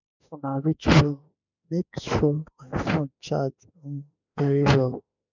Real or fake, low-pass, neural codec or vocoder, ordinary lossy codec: fake; 7.2 kHz; autoencoder, 48 kHz, 32 numbers a frame, DAC-VAE, trained on Japanese speech; none